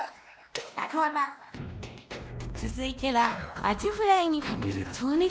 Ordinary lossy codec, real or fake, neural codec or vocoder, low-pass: none; fake; codec, 16 kHz, 1 kbps, X-Codec, WavLM features, trained on Multilingual LibriSpeech; none